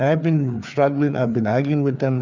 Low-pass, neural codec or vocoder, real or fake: 7.2 kHz; codec, 16 kHz, 4 kbps, FreqCodec, larger model; fake